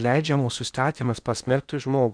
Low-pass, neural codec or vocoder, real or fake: 9.9 kHz; codec, 16 kHz in and 24 kHz out, 0.8 kbps, FocalCodec, streaming, 65536 codes; fake